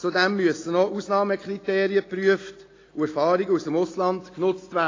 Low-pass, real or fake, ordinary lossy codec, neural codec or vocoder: 7.2 kHz; real; AAC, 32 kbps; none